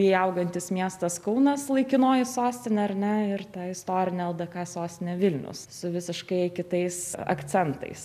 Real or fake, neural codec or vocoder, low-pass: real; none; 14.4 kHz